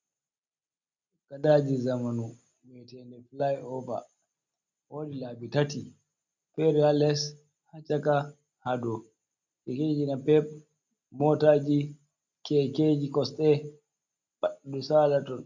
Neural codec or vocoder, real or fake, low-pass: none; real; 7.2 kHz